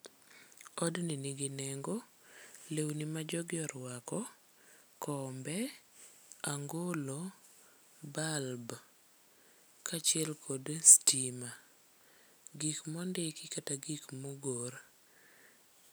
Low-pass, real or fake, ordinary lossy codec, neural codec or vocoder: none; real; none; none